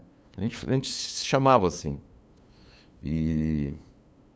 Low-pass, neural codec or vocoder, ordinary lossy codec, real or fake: none; codec, 16 kHz, 2 kbps, FunCodec, trained on LibriTTS, 25 frames a second; none; fake